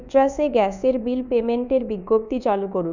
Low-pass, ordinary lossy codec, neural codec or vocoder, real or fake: 7.2 kHz; none; codec, 24 kHz, 1.2 kbps, DualCodec; fake